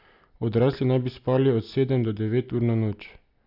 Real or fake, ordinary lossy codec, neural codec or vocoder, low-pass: real; none; none; 5.4 kHz